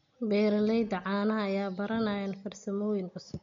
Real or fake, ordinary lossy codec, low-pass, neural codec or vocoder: real; MP3, 48 kbps; 7.2 kHz; none